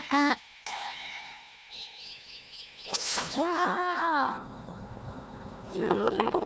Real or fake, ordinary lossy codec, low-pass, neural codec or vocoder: fake; none; none; codec, 16 kHz, 1 kbps, FunCodec, trained on Chinese and English, 50 frames a second